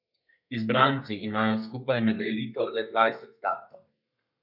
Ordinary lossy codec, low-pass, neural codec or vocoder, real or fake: none; 5.4 kHz; codec, 32 kHz, 1.9 kbps, SNAC; fake